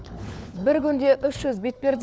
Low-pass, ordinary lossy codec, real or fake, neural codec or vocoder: none; none; fake; codec, 16 kHz, 4 kbps, FunCodec, trained on LibriTTS, 50 frames a second